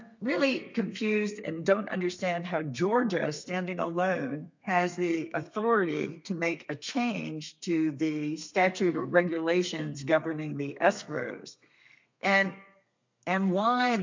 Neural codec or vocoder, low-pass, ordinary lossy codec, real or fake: codec, 32 kHz, 1.9 kbps, SNAC; 7.2 kHz; MP3, 48 kbps; fake